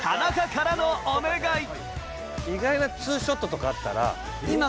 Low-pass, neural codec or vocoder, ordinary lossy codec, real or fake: none; none; none; real